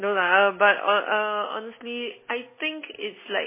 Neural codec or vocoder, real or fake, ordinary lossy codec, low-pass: none; real; MP3, 16 kbps; 3.6 kHz